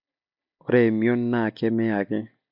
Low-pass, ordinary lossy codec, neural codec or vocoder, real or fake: 5.4 kHz; none; none; real